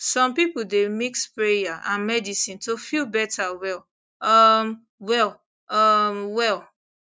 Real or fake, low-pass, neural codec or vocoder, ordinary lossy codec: real; none; none; none